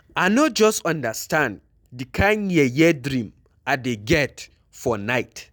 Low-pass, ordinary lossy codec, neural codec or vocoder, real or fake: none; none; none; real